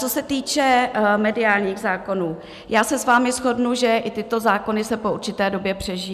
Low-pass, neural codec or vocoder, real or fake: 14.4 kHz; none; real